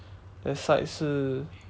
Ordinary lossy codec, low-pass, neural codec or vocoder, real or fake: none; none; none; real